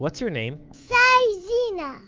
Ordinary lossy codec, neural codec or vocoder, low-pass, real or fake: Opus, 24 kbps; codec, 24 kHz, 3.1 kbps, DualCodec; 7.2 kHz; fake